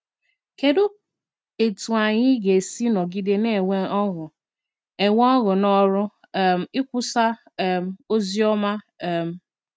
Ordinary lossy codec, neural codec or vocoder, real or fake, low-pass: none; none; real; none